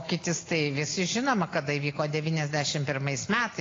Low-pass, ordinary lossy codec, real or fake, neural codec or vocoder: 7.2 kHz; AAC, 32 kbps; real; none